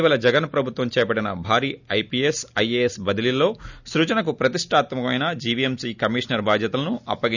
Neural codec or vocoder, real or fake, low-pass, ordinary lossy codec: none; real; 7.2 kHz; none